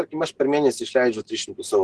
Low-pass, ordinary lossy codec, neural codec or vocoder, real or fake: 10.8 kHz; Opus, 16 kbps; none; real